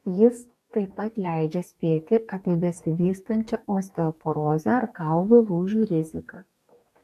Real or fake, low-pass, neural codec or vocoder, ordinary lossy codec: fake; 14.4 kHz; codec, 44.1 kHz, 2.6 kbps, DAC; AAC, 96 kbps